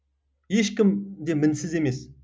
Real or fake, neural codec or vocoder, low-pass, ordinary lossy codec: real; none; none; none